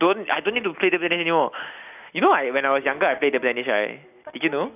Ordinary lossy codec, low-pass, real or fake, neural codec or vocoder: none; 3.6 kHz; real; none